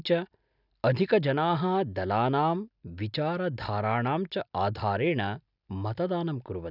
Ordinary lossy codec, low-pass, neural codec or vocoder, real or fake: none; 5.4 kHz; none; real